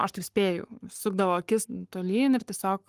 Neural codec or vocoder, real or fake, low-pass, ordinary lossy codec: codec, 44.1 kHz, 7.8 kbps, Pupu-Codec; fake; 14.4 kHz; Opus, 32 kbps